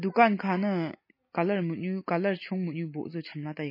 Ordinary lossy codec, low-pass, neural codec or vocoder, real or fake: MP3, 24 kbps; 5.4 kHz; none; real